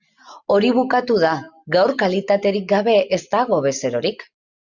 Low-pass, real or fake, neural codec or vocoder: 7.2 kHz; real; none